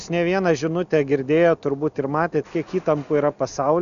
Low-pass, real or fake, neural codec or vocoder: 7.2 kHz; real; none